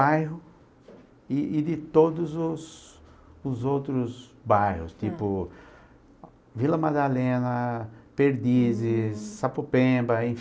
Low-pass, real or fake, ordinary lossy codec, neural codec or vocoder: none; real; none; none